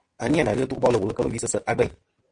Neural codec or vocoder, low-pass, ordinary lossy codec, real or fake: vocoder, 22.05 kHz, 80 mel bands, WaveNeXt; 9.9 kHz; MP3, 48 kbps; fake